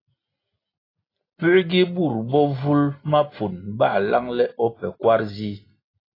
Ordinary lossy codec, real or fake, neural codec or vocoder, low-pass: AAC, 24 kbps; real; none; 5.4 kHz